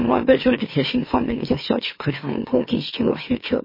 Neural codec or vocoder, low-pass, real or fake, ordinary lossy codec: autoencoder, 44.1 kHz, a latent of 192 numbers a frame, MeloTTS; 5.4 kHz; fake; MP3, 24 kbps